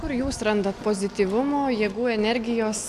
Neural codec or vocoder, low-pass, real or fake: none; 14.4 kHz; real